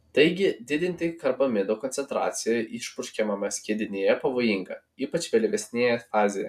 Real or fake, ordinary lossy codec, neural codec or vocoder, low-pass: real; AAC, 96 kbps; none; 14.4 kHz